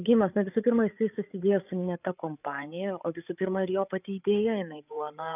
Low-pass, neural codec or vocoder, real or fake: 3.6 kHz; codec, 16 kHz, 8 kbps, FreqCodec, larger model; fake